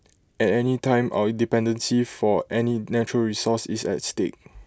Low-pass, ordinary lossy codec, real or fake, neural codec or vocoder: none; none; real; none